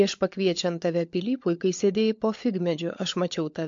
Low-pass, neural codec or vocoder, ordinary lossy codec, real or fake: 7.2 kHz; codec, 16 kHz, 16 kbps, FunCodec, trained on LibriTTS, 50 frames a second; MP3, 48 kbps; fake